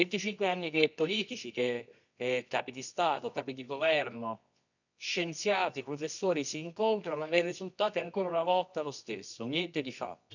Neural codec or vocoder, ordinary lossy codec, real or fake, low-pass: codec, 24 kHz, 0.9 kbps, WavTokenizer, medium music audio release; none; fake; 7.2 kHz